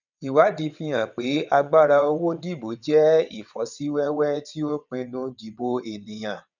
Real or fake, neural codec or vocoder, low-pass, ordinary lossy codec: fake; vocoder, 22.05 kHz, 80 mel bands, WaveNeXt; 7.2 kHz; none